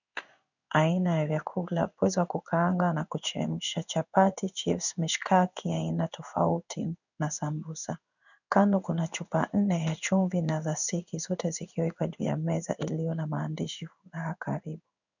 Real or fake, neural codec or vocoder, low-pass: fake; codec, 16 kHz in and 24 kHz out, 1 kbps, XY-Tokenizer; 7.2 kHz